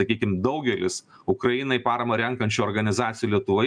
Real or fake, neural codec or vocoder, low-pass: real; none; 9.9 kHz